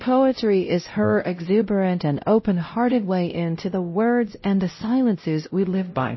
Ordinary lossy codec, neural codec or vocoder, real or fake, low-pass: MP3, 24 kbps; codec, 16 kHz, 0.5 kbps, X-Codec, WavLM features, trained on Multilingual LibriSpeech; fake; 7.2 kHz